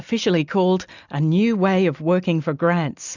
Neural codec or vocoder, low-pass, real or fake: none; 7.2 kHz; real